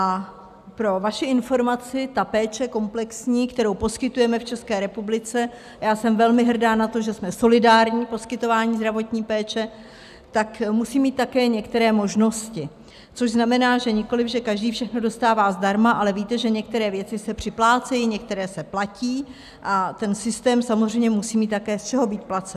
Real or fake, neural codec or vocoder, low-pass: real; none; 14.4 kHz